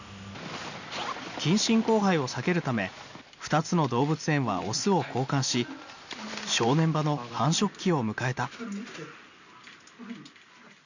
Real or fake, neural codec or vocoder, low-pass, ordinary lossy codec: real; none; 7.2 kHz; none